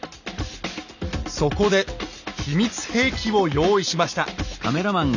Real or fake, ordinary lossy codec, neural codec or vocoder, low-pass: real; none; none; 7.2 kHz